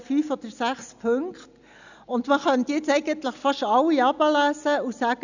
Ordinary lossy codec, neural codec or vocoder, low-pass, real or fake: none; none; 7.2 kHz; real